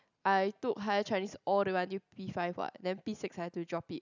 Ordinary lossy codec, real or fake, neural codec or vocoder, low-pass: none; real; none; 7.2 kHz